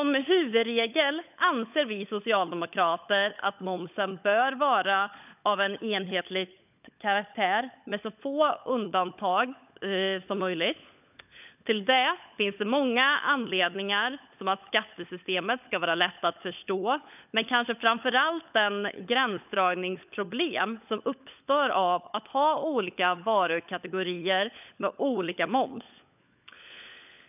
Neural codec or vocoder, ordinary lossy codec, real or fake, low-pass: codec, 16 kHz, 16 kbps, FunCodec, trained on LibriTTS, 50 frames a second; none; fake; 3.6 kHz